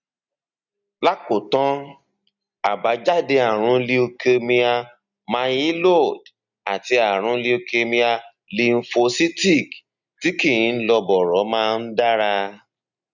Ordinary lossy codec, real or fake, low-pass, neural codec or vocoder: none; real; 7.2 kHz; none